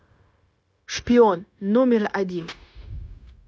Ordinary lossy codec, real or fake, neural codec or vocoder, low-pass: none; fake; codec, 16 kHz, 0.9 kbps, LongCat-Audio-Codec; none